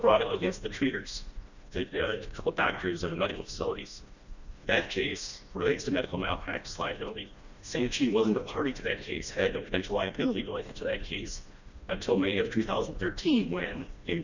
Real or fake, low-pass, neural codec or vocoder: fake; 7.2 kHz; codec, 16 kHz, 1 kbps, FreqCodec, smaller model